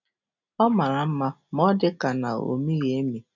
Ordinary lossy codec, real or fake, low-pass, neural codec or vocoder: none; real; 7.2 kHz; none